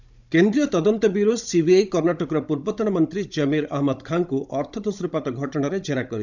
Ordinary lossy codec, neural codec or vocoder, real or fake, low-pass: none; codec, 16 kHz, 16 kbps, FunCodec, trained on Chinese and English, 50 frames a second; fake; 7.2 kHz